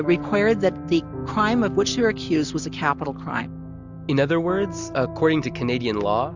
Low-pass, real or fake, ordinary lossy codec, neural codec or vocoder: 7.2 kHz; real; Opus, 64 kbps; none